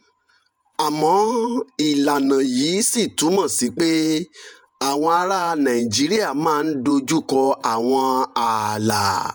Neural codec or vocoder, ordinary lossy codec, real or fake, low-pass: vocoder, 48 kHz, 128 mel bands, Vocos; none; fake; none